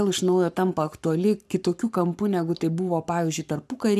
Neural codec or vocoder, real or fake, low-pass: codec, 44.1 kHz, 7.8 kbps, Pupu-Codec; fake; 14.4 kHz